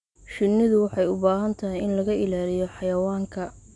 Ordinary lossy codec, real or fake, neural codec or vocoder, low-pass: none; real; none; 10.8 kHz